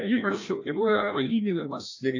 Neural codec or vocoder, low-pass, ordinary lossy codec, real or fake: codec, 16 kHz, 1 kbps, FreqCodec, larger model; 7.2 kHz; AAC, 48 kbps; fake